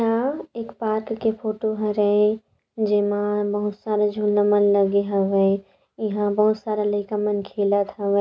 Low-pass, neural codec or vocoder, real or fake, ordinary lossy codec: none; none; real; none